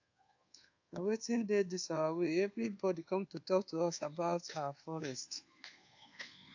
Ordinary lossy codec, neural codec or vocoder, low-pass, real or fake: AAC, 48 kbps; codec, 24 kHz, 1.2 kbps, DualCodec; 7.2 kHz; fake